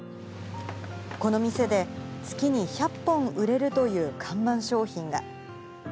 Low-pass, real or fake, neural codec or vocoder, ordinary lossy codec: none; real; none; none